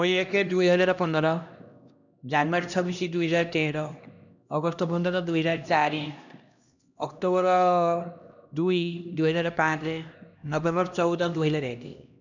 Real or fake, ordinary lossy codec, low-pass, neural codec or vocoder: fake; none; 7.2 kHz; codec, 16 kHz, 1 kbps, X-Codec, HuBERT features, trained on LibriSpeech